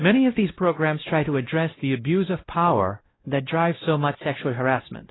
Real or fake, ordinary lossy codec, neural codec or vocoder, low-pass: fake; AAC, 16 kbps; codec, 16 kHz, 0.5 kbps, X-Codec, WavLM features, trained on Multilingual LibriSpeech; 7.2 kHz